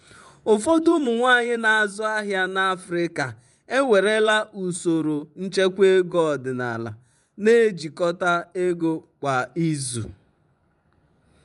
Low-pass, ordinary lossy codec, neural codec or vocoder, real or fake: 10.8 kHz; MP3, 96 kbps; vocoder, 24 kHz, 100 mel bands, Vocos; fake